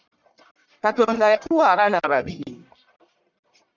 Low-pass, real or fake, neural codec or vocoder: 7.2 kHz; fake; codec, 44.1 kHz, 1.7 kbps, Pupu-Codec